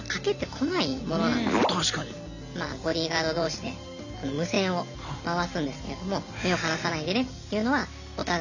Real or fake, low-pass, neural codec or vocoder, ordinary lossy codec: real; 7.2 kHz; none; none